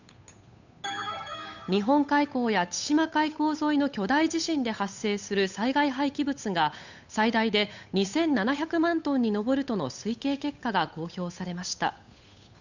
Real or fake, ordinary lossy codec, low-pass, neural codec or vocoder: fake; none; 7.2 kHz; codec, 16 kHz, 8 kbps, FunCodec, trained on Chinese and English, 25 frames a second